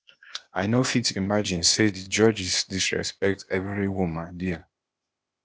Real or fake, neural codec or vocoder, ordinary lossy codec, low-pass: fake; codec, 16 kHz, 0.8 kbps, ZipCodec; none; none